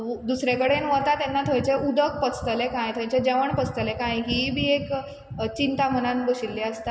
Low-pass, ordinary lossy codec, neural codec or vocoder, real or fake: none; none; none; real